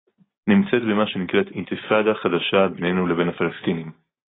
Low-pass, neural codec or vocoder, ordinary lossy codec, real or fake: 7.2 kHz; none; AAC, 16 kbps; real